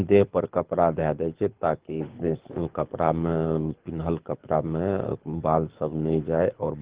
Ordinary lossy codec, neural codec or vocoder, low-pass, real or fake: Opus, 16 kbps; codec, 24 kHz, 6 kbps, HILCodec; 3.6 kHz; fake